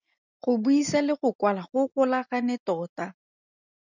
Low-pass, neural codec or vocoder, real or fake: 7.2 kHz; none; real